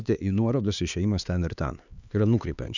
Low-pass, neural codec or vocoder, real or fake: 7.2 kHz; codec, 16 kHz, 4 kbps, X-Codec, HuBERT features, trained on LibriSpeech; fake